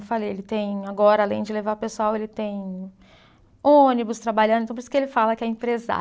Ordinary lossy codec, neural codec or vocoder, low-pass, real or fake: none; none; none; real